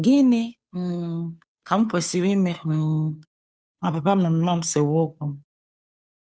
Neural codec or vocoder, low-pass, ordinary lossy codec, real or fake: codec, 16 kHz, 2 kbps, FunCodec, trained on Chinese and English, 25 frames a second; none; none; fake